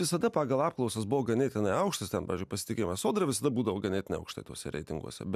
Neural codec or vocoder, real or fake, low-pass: none; real; 14.4 kHz